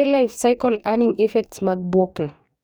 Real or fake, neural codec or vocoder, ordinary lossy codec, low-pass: fake; codec, 44.1 kHz, 2.6 kbps, DAC; none; none